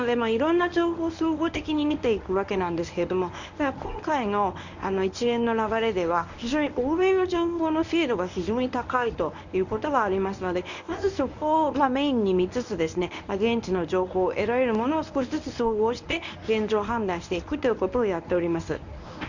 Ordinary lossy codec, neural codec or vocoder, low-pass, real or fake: none; codec, 24 kHz, 0.9 kbps, WavTokenizer, medium speech release version 1; 7.2 kHz; fake